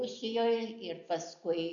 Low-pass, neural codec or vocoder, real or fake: 7.2 kHz; none; real